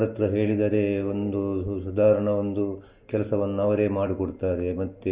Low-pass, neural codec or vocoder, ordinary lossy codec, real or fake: 3.6 kHz; none; Opus, 24 kbps; real